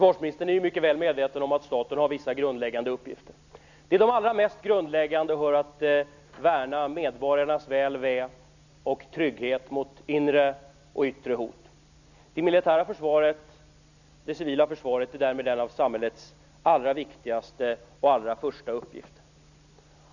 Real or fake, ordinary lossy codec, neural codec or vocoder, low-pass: real; none; none; 7.2 kHz